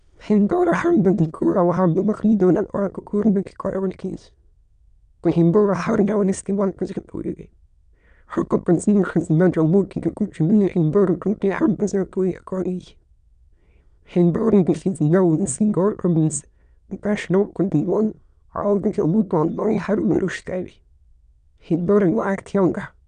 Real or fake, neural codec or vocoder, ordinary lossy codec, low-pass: fake; autoencoder, 22.05 kHz, a latent of 192 numbers a frame, VITS, trained on many speakers; none; 9.9 kHz